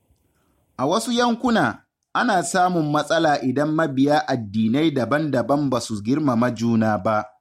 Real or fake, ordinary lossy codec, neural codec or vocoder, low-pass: real; MP3, 64 kbps; none; 19.8 kHz